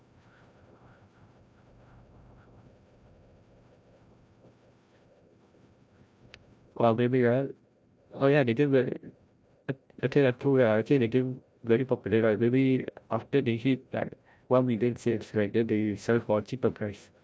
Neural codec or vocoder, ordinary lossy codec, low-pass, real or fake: codec, 16 kHz, 0.5 kbps, FreqCodec, larger model; none; none; fake